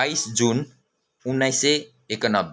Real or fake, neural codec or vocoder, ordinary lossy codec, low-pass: real; none; none; none